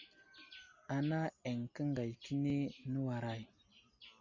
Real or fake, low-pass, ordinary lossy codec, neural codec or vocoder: real; 7.2 kHz; MP3, 64 kbps; none